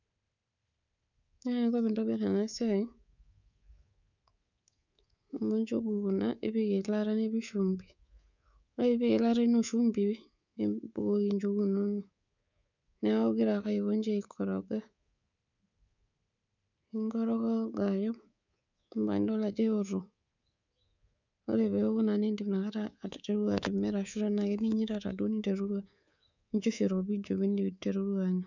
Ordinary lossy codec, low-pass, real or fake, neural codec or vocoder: none; 7.2 kHz; fake; codec, 24 kHz, 3.1 kbps, DualCodec